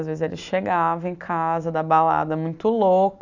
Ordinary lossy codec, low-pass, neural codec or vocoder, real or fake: none; 7.2 kHz; none; real